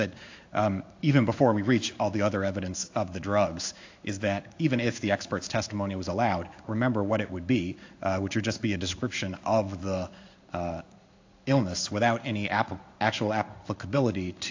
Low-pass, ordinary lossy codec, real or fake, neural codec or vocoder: 7.2 kHz; AAC, 48 kbps; fake; codec, 16 kHz in and 24 kHz out, 1 kbps, XY-Tokenizer